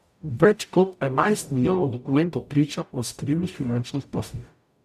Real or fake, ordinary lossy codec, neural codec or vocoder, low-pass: fake; none; codec, 44.1 kHz, 0.9 kbps, DAC; 14.4 kHz